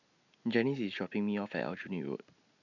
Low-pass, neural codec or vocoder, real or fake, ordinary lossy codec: 7.2 kHz; none; real; none